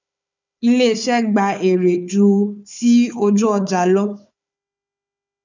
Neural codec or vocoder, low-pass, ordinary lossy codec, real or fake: codec, 16 kHz, 4 kbps, FunCodec, trained on Chinese and English, 50 frames a second; 7.2 kHz; none; fake